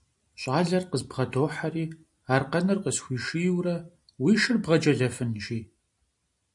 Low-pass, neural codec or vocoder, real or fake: 10.8 kHz; none; real